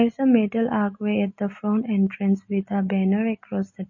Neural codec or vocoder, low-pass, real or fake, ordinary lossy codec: none; 7.2 kHz; real; MP3, 32 kbps